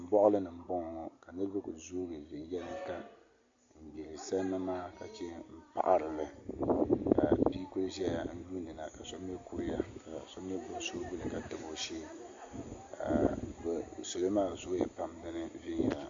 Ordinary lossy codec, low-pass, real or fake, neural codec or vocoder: AAC, 48 kbps; 7.2 kHz; real; none